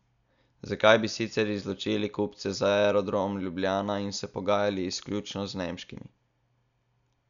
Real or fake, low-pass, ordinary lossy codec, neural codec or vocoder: real; 7.2 kHz; none; none